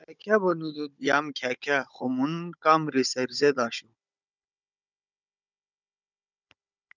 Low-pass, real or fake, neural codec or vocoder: 7.2 kHz; fake; codec, 16 kHz, 16 kbps, FunCodec, trained on Chinese and English, 50 frames a second